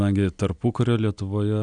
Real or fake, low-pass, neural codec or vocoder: real; 9.9 kHz; none